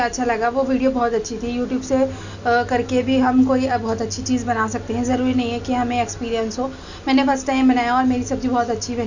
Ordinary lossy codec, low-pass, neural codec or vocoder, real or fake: none; 7.2 kHz; none; real